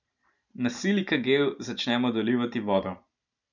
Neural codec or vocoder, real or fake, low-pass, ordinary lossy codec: vocoder, 44.1 kHz, 128 mel bands every 512 samples, BigVGAN v2; fake; 7.2 kHz; none